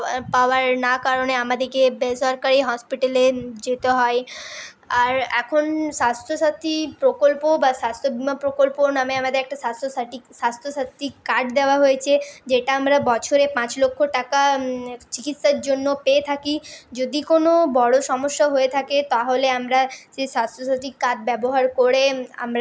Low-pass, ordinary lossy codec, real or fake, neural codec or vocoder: none; none; real; none